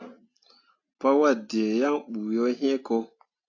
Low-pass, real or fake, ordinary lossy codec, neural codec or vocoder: 7.2 kHz; real; AAC, 48 kbps; none